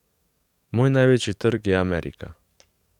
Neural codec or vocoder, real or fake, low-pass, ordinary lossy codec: codec, 44.1 kHz, 7.8 kbps, DAC; fake; 19.8 kHz; none